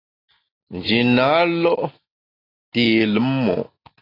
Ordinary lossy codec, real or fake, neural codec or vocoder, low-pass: AAC, 24 kbps; real; none; 5.4 kHz